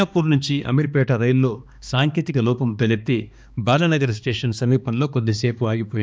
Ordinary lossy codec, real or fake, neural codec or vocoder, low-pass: none; fake; codec, 16 kHz, 2 kbps, X-Codec, HuBERT features, trained on balanced general audio; none